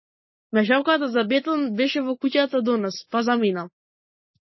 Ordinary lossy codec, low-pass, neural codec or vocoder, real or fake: MP3, 24 kbps; 7.2 kHz; none; real